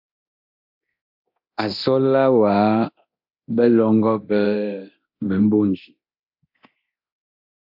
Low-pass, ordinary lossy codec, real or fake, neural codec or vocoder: 5.4 kHz; AAC, 48 kbps; fake; codec, 24 kHz, 0.9 kbps, DualCodec